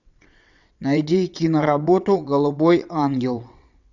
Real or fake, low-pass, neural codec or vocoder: fake; 7.2 kHz; vocoder, 22.05 kHz, 80 mel bands, WaveNeXt